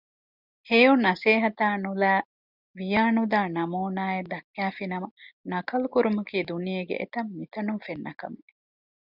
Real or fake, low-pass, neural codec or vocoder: real; 5.4 kHz; none